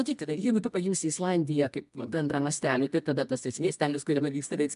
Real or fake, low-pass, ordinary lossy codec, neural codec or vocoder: fake; 10.8 kHz; MP3, 96 kbps; codec, 24 kHz, 0.9 kbps, WavTokenizer, medium music audio release